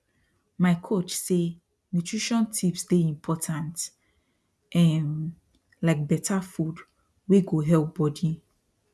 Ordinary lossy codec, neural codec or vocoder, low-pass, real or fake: none; none; none; real